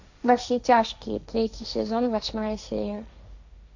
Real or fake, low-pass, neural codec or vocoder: fake; 7.2 kHz; codec, 16 kHz, 1.1 kbps, Voila-Tokenizer